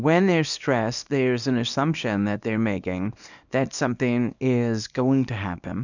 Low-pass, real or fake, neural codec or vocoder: 7.2 kHz; fake; codec, 24 kHz, 0.9 kbps, WavTokenizer, small release